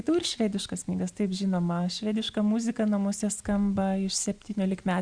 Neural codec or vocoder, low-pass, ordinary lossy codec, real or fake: none; 9.9 kHz; Opus, 64 kbps; real